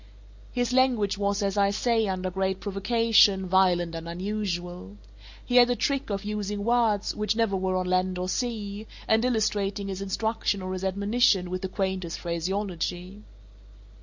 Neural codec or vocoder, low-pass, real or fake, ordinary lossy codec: none; 7.2 kHz; real; Opus, 64 kbps